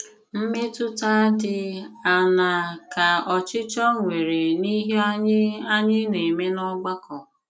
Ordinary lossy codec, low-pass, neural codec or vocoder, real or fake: none; none; none; real